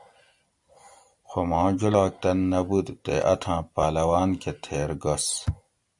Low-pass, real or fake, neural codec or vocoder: 10.8 kHz; real; none